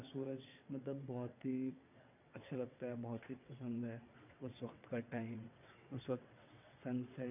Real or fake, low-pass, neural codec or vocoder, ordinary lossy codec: fake; 3.6 kHz; vocoder, 22.05 kHz, 80 mel bands, WaveNeXt; none